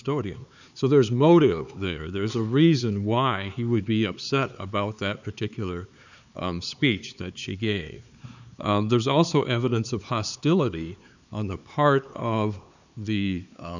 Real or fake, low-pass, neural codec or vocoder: fake; 7.2 kHz; codec, 16 kHz, 4 kbps, X-Codec, HuBERT features, trained on LibriSpeech